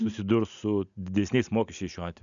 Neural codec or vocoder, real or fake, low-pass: none; real; 7.2 kHz